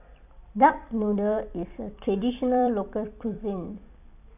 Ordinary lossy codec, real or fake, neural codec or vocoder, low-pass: none; fake; vocoder, 44.1 kHz, 128 mel bands every 256 samples, BigVGAN v2; 3.6 kHz